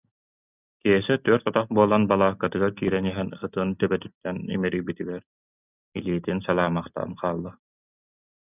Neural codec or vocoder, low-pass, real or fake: none; 3.6 kHz; real